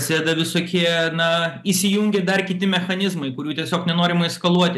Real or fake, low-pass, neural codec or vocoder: real; 14.4 kHz; none